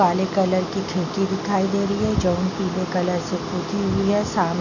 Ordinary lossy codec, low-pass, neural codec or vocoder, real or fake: none; 7.2 kHz; none; real